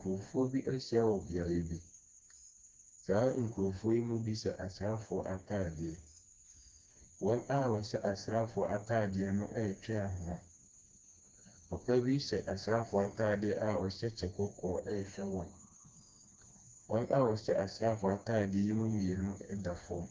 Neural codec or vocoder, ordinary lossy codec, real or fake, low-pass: codec, 16 kHz, 2 kbps, FreqCodec, smaller model; Opus, 24 kbps; fake; 7.2 kHz